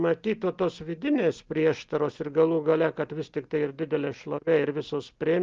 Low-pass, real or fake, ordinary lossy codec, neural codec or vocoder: 7.2 kHz; real; Opus, 32 kbps; none